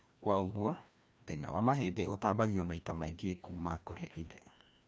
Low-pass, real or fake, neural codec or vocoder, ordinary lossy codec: none; fake; codec, 16 kHz, 1 kbps, FreqCodec, larger model; none